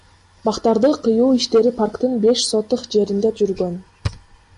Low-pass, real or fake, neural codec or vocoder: 10.8 kHz; real; none